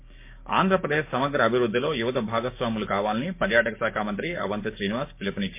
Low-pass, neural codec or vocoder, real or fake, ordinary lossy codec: 3.6 kHz; codec, 16 kHz, 6 kbps, DAC; fake; MP3, 24 kbps